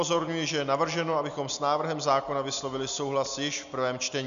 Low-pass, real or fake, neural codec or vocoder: 7.2 kHz; real; none